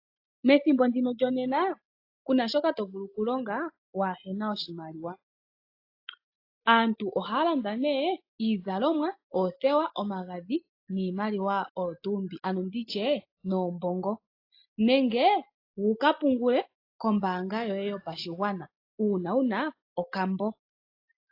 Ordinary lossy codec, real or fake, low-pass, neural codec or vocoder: AAC, 32 kbps; real; 5.4 kHz; none